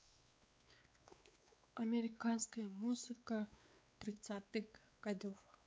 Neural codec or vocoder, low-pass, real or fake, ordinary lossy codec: codec, 16 kHz, 4 kbps, X-Codec, WavLM features, trained on Multilingual LibriSpeech; none; fake; none